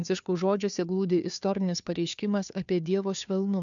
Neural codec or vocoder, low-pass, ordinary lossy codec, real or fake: codec, 16 kHz, 2 kbps, FunCodec, trained on Chinese and English, 25 frames a second; 7.2 kHz; MP3, 96 kbps; fake